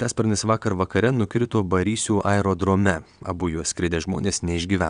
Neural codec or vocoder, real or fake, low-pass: vocoder, 22.05 kHz, 80 mel bands, WaveNeXt; fake; 9.9 kHz